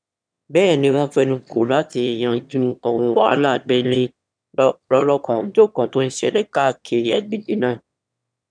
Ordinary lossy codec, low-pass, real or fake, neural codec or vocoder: none; 9.9 kHz; fake; autoencoder, 22.05 kHz, a latent of 192 numbers a frame, VITS, trained on one speaker